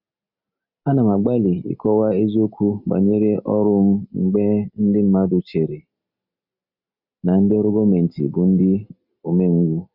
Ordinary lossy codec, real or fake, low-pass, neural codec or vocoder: none; real; 5.4 kHz; none